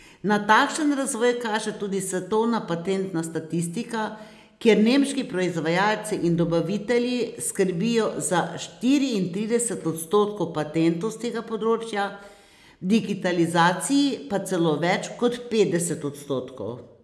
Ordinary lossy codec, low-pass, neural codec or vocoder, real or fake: none; none; none; real